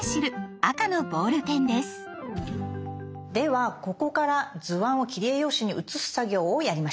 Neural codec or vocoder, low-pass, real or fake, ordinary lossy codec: none; none; real; none